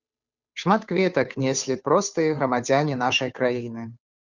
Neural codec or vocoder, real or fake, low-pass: codec, 16 kHz, 2 kbps, FunCodec, trained on Chinese and English, 25 frames a second; fake; 7.2 kHz